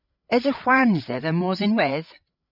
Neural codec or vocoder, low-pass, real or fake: codec, 16 kHz, 16 kbps, FreqCodec, larger model; 5.4 kHz; fake